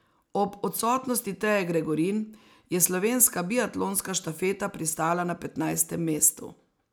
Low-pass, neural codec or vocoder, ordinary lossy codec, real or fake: none; none; none; real